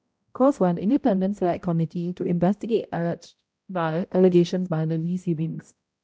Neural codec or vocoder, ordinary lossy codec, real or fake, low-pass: codec, 16 kHz, 0.5 kbps, X-Codec, HuBERT features, trained on balanced general audio; none; fake; none